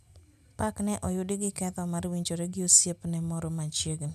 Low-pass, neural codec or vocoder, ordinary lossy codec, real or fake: 14.4 kHz; none; none; real